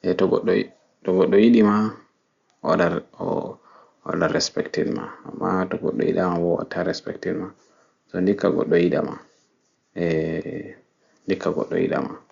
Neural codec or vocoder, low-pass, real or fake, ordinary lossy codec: none; 7.2 kHz; real; Opus, 64 kbps